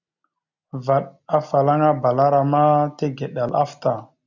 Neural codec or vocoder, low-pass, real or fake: none; 7.2 kHz; real